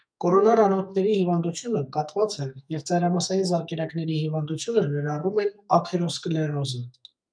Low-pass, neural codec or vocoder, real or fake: 9.9 kHz; codec, 44.1 kHz, 2.6 kbps, SNAC; fake